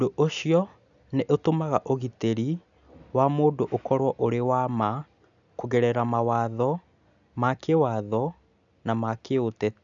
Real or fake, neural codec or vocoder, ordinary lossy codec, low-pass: real; none; none; 7.2 kHz